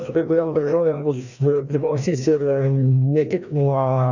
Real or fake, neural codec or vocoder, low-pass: fake; codec, 16 kHz, 1 kbps, FreqCodec, larger model; 7.2 kHz